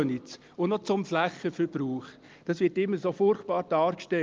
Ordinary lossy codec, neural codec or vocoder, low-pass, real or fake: Opus, 32 kbps; none; 7.2 kHz; real